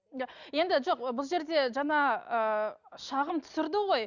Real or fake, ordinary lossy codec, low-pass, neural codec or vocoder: real; none; 7.2 kHz; none